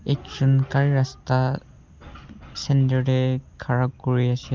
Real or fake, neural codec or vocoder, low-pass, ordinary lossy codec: real; none; 7.2 kHz; Opus, 24 kbps